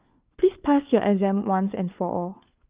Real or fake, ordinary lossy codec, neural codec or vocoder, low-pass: fake; Opus, 32 kbps; codec, 16 kHz, 4 kbps, FunCodec, trained on LibriTTS, 50 frames a second; 3.6 kHz